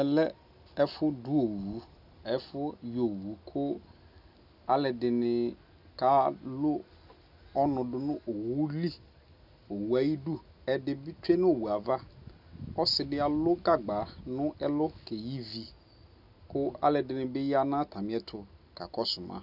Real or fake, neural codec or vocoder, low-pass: real; none; 5.4 kHz